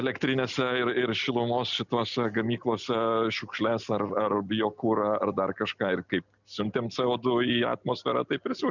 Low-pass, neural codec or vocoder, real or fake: 7.2 kHz; none; real